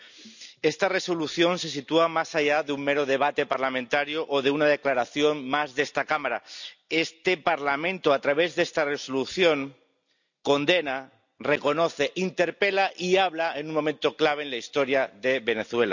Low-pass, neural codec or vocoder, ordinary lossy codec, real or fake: 7.2 kHz; none; none; real